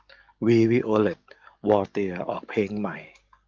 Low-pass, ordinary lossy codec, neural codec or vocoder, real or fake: 7.2 kHz; Opus, 32 kbps; codec, 44.1 kHz, 7.8 kbps, DAC; fake